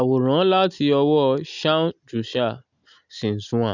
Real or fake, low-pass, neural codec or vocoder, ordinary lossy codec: real; 7.2 kHz; none; none